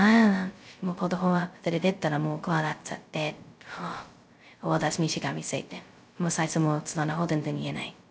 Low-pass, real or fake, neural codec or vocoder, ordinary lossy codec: none; fake; codec, 16 kHz, 0.2 kbps, FocalCodec; none